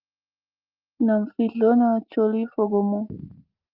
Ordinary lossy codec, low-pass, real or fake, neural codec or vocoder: Opus, 32 kbps; 5.4 kHz; real; none